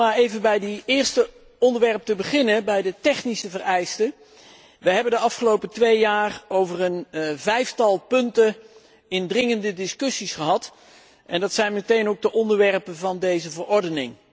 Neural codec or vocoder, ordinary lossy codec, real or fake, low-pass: none; none; real; none